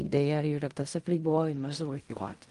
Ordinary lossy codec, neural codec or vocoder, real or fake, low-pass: Opus, 32 kbps; codec, 16 kHz in and 24 kHz out, 0.4 kbps, LongCat-Audio-Codec, fine tuned four codebook decoder; fake; 10.8 kHz